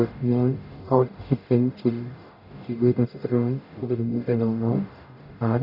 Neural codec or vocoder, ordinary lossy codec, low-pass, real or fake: codec, 44.1 kHz, 0.9 kbps, DAC; none; 5.4 kHz; fake